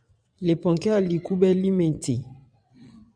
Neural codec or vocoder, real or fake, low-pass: vocoder, 22.05 kHz, 80 mel bands, WaveNeXt; fake; 9.9 kHz